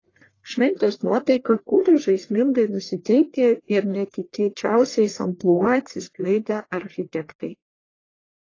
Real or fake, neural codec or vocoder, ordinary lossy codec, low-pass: fake; codec, 44.1 kHz, 1.7 kbps, Pupu-Codec; AAC, 32 kbps; 7.2 kHz